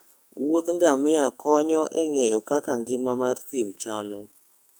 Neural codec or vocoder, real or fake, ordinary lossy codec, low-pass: codec, 44.1 kHz, 2.6 kbps, SNAC; fake; none; none